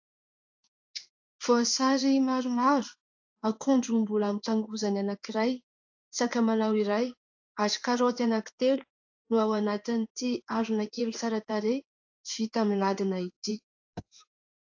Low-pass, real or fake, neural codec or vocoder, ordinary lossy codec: 7.2 kHz; fake; codec, 16 kHz in and 24 kHz out, 1 kbps, XY-Tokenizer; AAC, 48 kbps